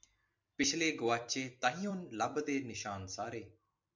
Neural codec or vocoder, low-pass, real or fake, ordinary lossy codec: vocoder, 44.1 kHz, 128 mel bands every 256 samples, BigVGAN v2; 7.2 kHz; fake; MP3, 64 kbps